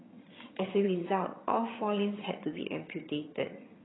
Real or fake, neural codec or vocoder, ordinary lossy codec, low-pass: fake; vocoder, 22.05 kHz, 80 mel bands, HiFi-GAN; AAC, 16 kbps; 7.2 kHz